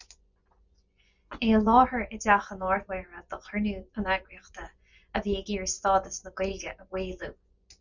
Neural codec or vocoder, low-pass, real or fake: vocoder, 24 kHz, 100 mel bands, Vocos; 7.2 kHz; fake